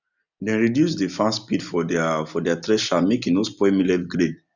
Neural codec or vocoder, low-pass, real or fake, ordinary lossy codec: none; 7.2 kHz; real; none